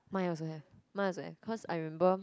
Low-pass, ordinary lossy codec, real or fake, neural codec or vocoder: none; none; real; none